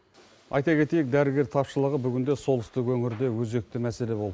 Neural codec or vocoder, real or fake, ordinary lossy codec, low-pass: none; real; none; none